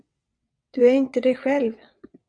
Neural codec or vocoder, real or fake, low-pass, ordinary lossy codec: vocoder, 22.05 kHz, 80 mel bands, WaveNeXt; fake; 9.9 kHz; MP3, 64 kbps